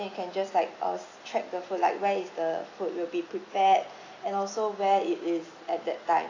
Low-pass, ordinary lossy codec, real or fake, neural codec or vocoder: 7.2 kHz; AAC, 32 kbps; real; none